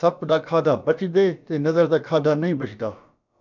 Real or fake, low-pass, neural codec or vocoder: fake; 7.2 kHz; codec, 16 kHz, about 1 kbps, DyCAST, with the encoder's durations